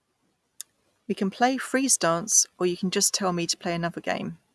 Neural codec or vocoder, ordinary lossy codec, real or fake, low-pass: none; none; real; none